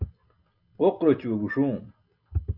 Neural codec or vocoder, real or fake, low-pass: none; real; 5.4 kHz